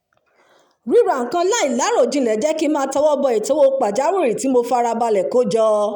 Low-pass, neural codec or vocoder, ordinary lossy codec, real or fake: 19.8 kHz; none; none; real